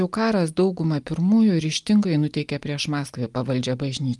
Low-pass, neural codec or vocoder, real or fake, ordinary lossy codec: 10.8 kHz; none; real; Opus, 32 kbps